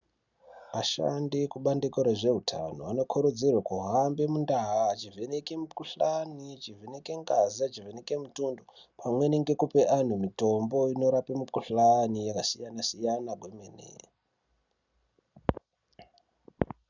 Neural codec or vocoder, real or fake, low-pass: none; real; 7.2 kHz